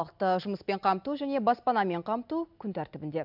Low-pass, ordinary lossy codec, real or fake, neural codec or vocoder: 5.4 kHz; none; real; none